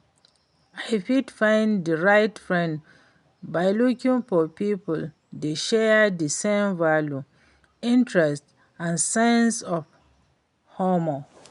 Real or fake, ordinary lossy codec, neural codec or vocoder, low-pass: real; none; none; 10.8 kHz